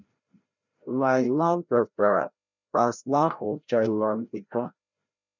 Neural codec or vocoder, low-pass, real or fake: codec, 16 kHz, 0.5 kbps, FreqCodec, larger model; 7.2 kHz; fake